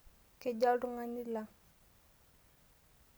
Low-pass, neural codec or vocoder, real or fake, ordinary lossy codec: none; none; real; none